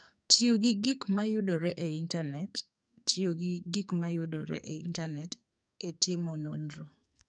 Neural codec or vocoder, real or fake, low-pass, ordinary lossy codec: codec, 44.1 kHz, 2.6 kbps, SNAC; fake; 9.9 kHz; none